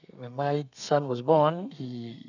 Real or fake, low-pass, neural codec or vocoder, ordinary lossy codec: fake; 7.2 kHz; codec, 32 kHz, 1.9 kbps, SNAC; none